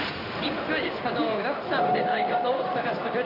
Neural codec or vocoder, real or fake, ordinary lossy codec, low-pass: codec, 16 kHz in and 24 kHz out, 1 kbps, XY-Tokenizer; fake; none; 5.4 kHz